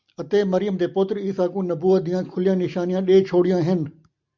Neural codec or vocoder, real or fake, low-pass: none; real; 7.2 kHz